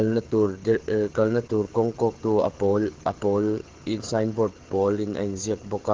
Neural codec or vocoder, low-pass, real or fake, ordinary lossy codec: codec, 16 kHz, 16 kbps, FreqCodec, smaller model; 7.2 kHz; fake; Opus, 16 kbps